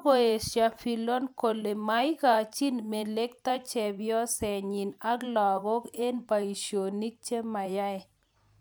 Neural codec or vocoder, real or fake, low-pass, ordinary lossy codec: vocoder, 44.1 kHz, 128 mel bands every 512 samples, BigVGAN v2; fake; none; none